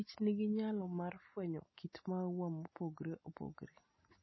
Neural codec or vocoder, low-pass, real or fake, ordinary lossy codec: none; 7.2 kHz; real; MP3, 24 kbps